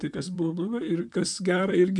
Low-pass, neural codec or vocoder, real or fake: 10.8 kHz; vocoder, 44.1 kHz, 128 mel bands, Pupu-Vocoder; fake